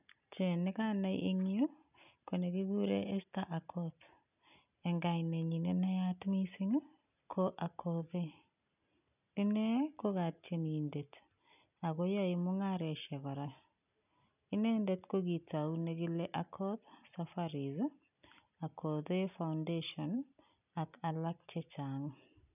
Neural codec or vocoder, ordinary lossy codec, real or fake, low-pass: none; none; real; 3.6 kHz